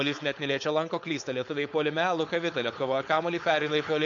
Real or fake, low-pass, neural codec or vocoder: fake; 7.2 kHz; codec, 16 kHz, 4.8 kbps, FACodec